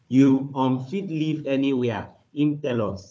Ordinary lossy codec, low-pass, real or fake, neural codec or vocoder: none; none; fake; codec, 16 kHz, 4 kbps, FunCodec, trained on Chinese and English, 50 frames a second